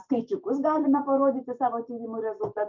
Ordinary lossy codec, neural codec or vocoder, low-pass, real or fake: MP3, 64 kbps; vocoder, 44.1 kHz, 128 mel bands every 256 samples, BigVGAN v2; 7.2 kHz; fake